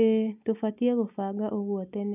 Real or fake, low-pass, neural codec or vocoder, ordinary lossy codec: real; 3.6 kHz; none; none